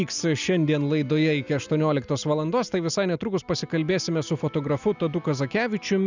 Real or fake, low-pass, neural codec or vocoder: real; 7.2 kHz; none